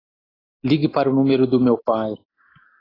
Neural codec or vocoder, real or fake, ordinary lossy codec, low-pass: none; real; AAC, 24 kbps; 5.4 kHz